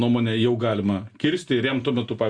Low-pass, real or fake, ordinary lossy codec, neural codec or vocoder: 9.9 kHz; real; Opus, 64 kbps; none